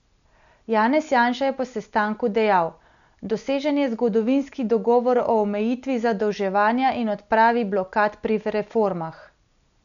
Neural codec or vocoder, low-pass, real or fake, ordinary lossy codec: none; 7.2 kHz; real; none